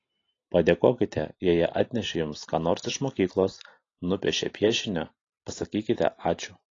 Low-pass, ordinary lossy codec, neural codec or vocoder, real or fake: 7.2 kHz; AAC, 32 kbps; none; real